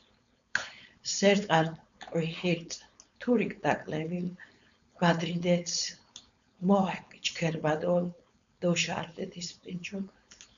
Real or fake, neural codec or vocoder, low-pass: fake; codec, 16 kHz, 4.8 kbps, FACodec; 7.2 kHz